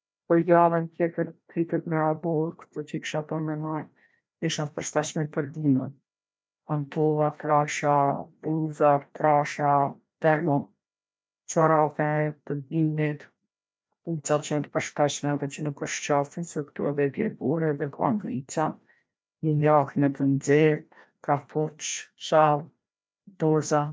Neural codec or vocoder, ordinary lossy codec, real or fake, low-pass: codec, 16 kHz, 1 kbps, FreqCodec, larger model; none; fake; none